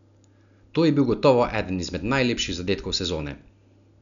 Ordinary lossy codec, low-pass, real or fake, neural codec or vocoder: none; 7.2 kHz; real; none